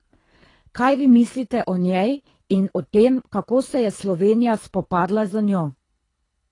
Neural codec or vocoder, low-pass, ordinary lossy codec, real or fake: codec, 24 kHz, 3 kbps, HILCodec; 10.8 kHz; AAC, 32 kbps; fake